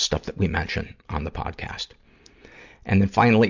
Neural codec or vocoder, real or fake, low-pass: none; real; 7.2 kHz